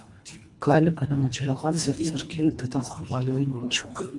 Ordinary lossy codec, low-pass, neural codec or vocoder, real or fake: MP3, 64 kbps; 10.8 kHz; codec, 24 kHz, 1.5 kbps, HILCodec; fake